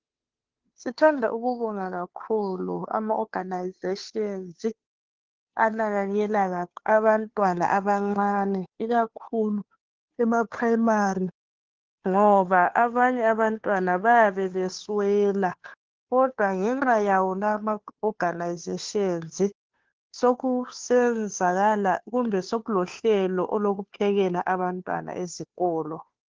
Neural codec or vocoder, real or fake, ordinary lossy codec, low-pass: codec, 16 kHz, 2 kbps, FunCodec, trained on Chinese and English, 25 frames a second; fake; Opus, 16 kbps; 7.2 kHz